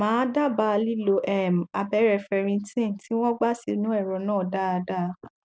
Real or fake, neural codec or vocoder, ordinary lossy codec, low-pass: real; none; none; none